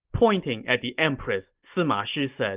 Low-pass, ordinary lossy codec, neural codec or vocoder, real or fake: 3.6 kHz; Opus, 32 kbps; none; real